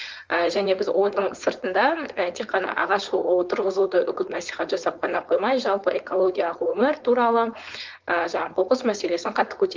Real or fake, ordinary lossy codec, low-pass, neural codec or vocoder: fake; Opus, 24 kbps; 7.2 kHz; codec, 16 kHz, 4.8 kbps, FACodec